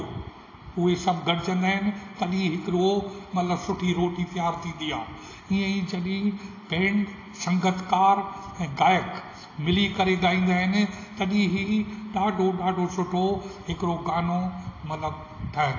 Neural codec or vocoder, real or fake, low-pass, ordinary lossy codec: none; real; 7.2 kHz; AAC, 32 kbps